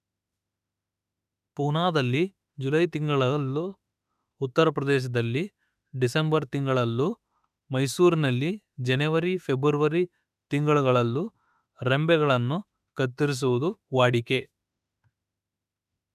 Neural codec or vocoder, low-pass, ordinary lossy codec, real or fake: autoencoder, 48 kHz, 32 numbers a frame, DAC-VAE, trained on Japanese speech; 14.4 kHz; none; fake